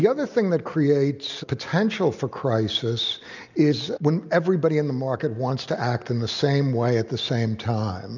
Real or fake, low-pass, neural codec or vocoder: real; 7.2 kHz; none